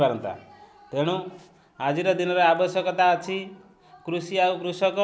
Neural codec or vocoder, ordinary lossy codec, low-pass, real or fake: none; none; none; real